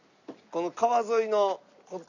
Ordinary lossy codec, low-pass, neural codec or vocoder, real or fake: none; 7.2 kHz; none; real